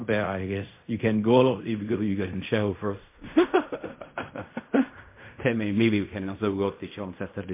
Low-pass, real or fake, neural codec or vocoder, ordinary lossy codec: 3.6 kHz; fake; codec, 16 kHz in and 24 kHz out, 0.4 kbps, LongCat-Audio-Codec, fine tuned four codebook decoder; MP3, 24 kbps